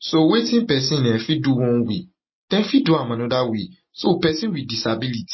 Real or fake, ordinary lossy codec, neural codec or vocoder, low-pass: real; MP3, 24 kbps; none; 7.2 kHz